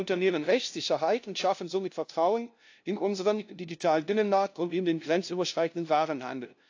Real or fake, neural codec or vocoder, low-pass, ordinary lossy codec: fake; codec, 16 kHz, 0.5 kbps, FunCodec, trained on LibriTTS, 25 frames a second; 7.2 kHz; AAC, 48 kbps